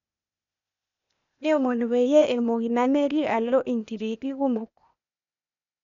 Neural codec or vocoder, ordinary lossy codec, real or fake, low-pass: codec, 16 kHz, 0.8 kbps, ZipCodec; none; fake; 7.2 kHz